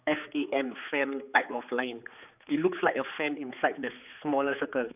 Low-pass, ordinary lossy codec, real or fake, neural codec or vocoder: 3.6 kHz; none; fake; codec, 16 kHz, 4 kbps, X-Codec, HuBERT features, trained on general audio